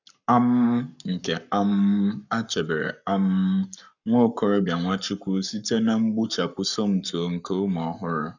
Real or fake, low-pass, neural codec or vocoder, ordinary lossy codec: fake; 7.2 kHz; codec, 44.1 kHz, 7.8 kbps, Pupu-Codec; none